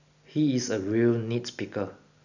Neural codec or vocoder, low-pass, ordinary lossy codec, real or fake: none; 7.2 kHz; none; real